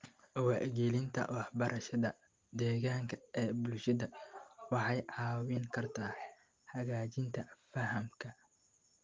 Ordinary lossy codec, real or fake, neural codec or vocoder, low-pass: Opus, 32 kbps; real; none; 7.2 kHz